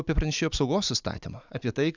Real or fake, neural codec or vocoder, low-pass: real; none; 7.2 kHz